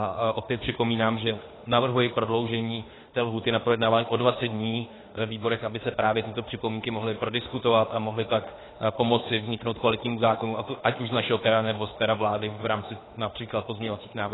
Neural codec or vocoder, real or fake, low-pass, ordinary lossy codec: autoencoder, 48 kHz, 32 numbers a frame, DAC-VAE, trained on Japanese speech; fake; 7.2 kHz; AAC, 16 kbps